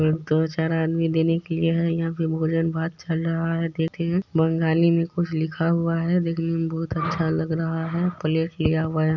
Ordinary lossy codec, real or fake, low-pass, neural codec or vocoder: none; real; 7.2 kHz; none